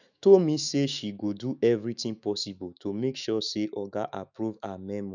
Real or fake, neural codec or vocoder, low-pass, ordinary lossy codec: fake; autoencoder, 48 kHz, 128 numbers a frame, DAC-VAE, trained on Japanese speech; 7.2 kHz; none